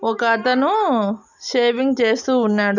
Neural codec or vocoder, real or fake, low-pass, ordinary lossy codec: none; real; 7.2 kHz; none